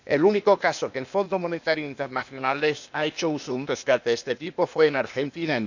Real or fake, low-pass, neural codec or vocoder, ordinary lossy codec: fake; 7.2 kHz; codec, 16 kHz, 0.8 kbps, ZipCodec; none